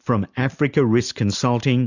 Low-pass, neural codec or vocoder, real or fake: 7.2 kHz; none; real